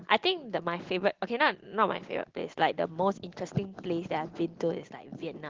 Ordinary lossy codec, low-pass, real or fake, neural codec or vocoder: Opus, 16 kbps; 7.2 kHz; real; none